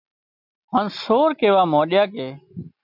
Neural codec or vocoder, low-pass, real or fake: none; 5.4 kHz; real